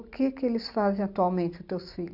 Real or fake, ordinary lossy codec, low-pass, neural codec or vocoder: real; none; 5.4 kHz; none